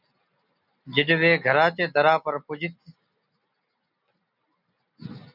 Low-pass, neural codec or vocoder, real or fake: 5.4 kHz; none; real